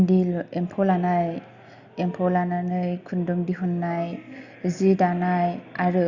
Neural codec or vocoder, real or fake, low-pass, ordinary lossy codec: none; real; 7.2 kHz; Opus, 64 kbps